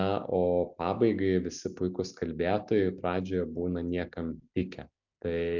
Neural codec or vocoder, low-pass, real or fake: none; 7.2 kHz; real